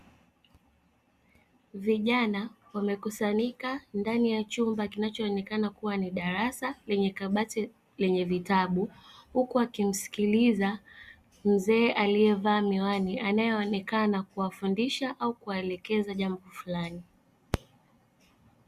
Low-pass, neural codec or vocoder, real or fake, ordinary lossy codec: 14.4 kHz; none; real; Opus, 64 kbps